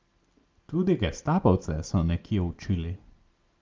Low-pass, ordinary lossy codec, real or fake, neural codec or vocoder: 7.2 kHz; Opus, 32 kbps; real; none